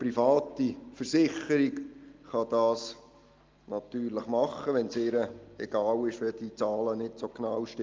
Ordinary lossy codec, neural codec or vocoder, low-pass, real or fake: Opus, 32 kbps; none; 7.2 kHz; real